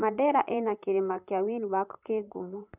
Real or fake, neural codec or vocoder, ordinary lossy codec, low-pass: fake; vocoder, 44.1 kHz, 128 mel bands, Pupu-Vocoder; none; 3.6 kHz